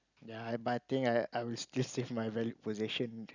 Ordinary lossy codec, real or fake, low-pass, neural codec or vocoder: none; real; 7.2 kHz; none